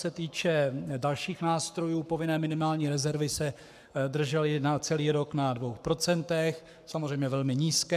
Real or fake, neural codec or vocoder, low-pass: fake; codec, 44.1 kHz, 7.8 kbps, Pupu-Codec; 14.4 kHz